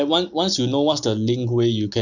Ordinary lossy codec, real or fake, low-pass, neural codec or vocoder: none; real; 7.2 kHz; none